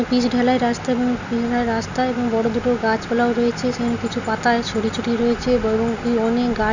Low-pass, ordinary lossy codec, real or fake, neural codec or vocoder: 7.2 kHz; none; real; none